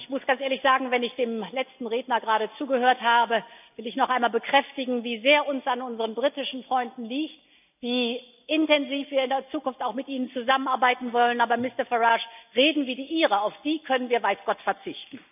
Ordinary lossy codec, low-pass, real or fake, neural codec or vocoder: none; 3.6 kHz; real; none